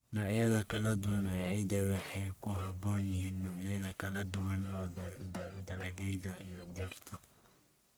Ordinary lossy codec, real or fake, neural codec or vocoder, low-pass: none; fake; codec, 44.1 kHz, 1.7 kbps, Pupu-Codec; none